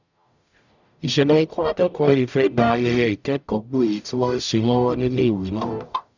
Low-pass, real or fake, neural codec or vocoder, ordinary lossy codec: 7.2 kHz; fake; codec, 44.1 kHz, 0.9 kbps, DAC; none